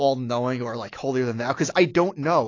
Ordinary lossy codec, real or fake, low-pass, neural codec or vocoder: AAC, 32 kbps; fake; 7.2 kHz; autoencoder, 48 kHz, 128 numbers a frame, DAC-VAE, trained on Japanese speech